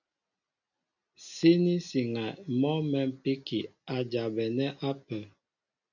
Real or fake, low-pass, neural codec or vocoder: real; 7.2 kHz; none